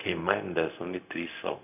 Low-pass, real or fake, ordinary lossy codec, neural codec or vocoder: 3.6 kHz; fake; none; codec, 16 kHz, 0.4 kbps, LongCat-Audio-Codec